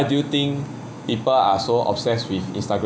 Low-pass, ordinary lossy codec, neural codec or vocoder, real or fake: none; none; none; real